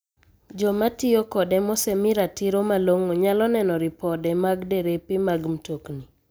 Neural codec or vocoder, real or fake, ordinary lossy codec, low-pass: none; real; none; none